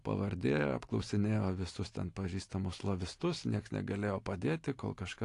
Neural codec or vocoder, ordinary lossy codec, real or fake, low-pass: none; AAC, 48 kbps; real; 10.8 kHz